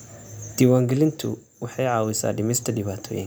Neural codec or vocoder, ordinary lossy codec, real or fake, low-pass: none; none; real; none